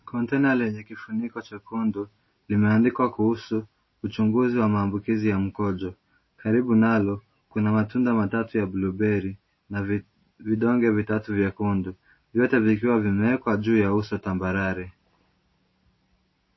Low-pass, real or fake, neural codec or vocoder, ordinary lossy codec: 7.2 kHz; real; none; MP3, 24 kbps